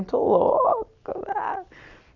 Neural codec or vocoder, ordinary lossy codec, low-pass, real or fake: none; none; 7.2 kHz; real